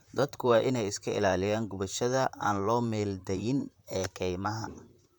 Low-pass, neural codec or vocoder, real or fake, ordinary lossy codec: 19.8 kHz; vocoder, 44.1 kHz, 128 mel bands, Pupu-Vocoder; fake; none